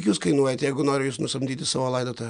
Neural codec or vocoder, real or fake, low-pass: none; real; 9.9 kHz